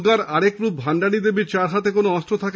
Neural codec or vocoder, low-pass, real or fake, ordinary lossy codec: none; none; real; none